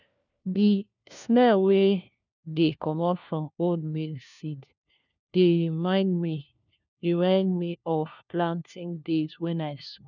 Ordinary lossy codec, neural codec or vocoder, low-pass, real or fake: none; codec, 16 kHz, 1 kbps, FunCodec, trained on LibriTTS, 50 frames a second; 7.2 kHz; fake